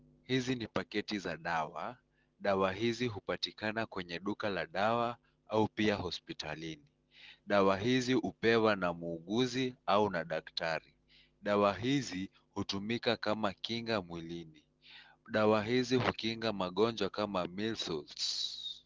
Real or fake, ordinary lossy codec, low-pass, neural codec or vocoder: real; Opus, 16 kbps; 7.2 kHz; none